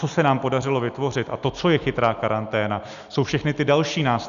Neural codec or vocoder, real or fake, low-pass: none; real; 7.2 kHz